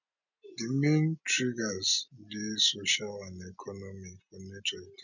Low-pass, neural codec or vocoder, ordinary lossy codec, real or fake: 7.2 kHz; none; none; real